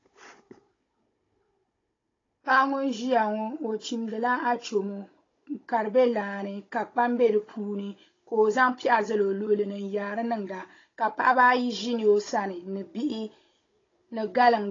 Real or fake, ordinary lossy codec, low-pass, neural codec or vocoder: fake; AAC, 32 kbps; 7.2 kHz; codec, 16 kHz, 16 kbps, FunCodec, trained on Chinese and English, 50 frames a second